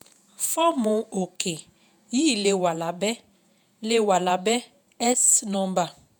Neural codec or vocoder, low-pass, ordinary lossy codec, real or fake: vocoder, 48 kHz, 128 mel bands, Vocos; none; none; fake